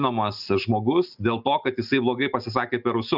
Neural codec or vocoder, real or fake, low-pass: none; real; 5.4 kHz